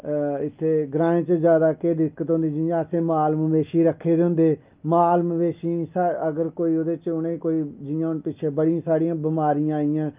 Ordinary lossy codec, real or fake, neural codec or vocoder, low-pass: Opus, 24 kbps; real; none; 3.6 kHz